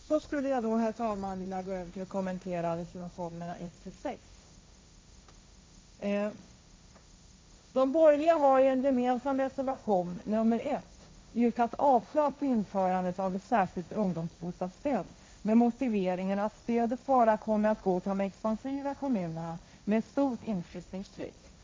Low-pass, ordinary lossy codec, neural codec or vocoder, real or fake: none; none; codec, 16 kHz, 1.1 kbps, Voila-Tokenizer; fake